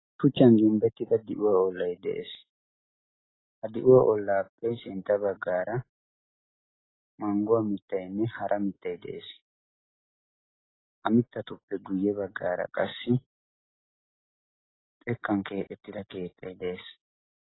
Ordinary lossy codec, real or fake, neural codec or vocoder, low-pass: AAC, 16 kbps; real; none; 7.2 kHz